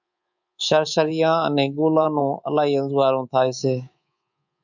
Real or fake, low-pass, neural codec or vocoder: fake; 7.2 kHz; codec, 24 kHz, 3.1 kbps, DualCodec